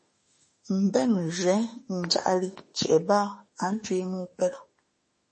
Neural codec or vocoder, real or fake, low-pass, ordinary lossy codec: autoencoder, 48 kHz, 32 numbers a frame, DAC-VAE, trained on Japanese speech; fake; 10.8 kHz; MP3, 32 kbps